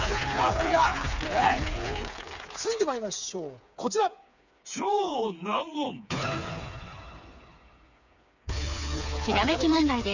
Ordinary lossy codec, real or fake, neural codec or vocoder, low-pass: none; fake; codec, 16 kHz, 4 kbps, FreqCodec, smaller model; 7.2 kHz